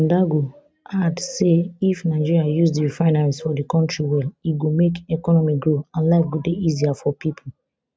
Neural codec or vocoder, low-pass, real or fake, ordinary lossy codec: none; none; real; none